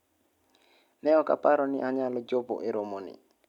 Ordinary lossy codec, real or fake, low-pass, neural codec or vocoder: none; real; 19.8 kHz; none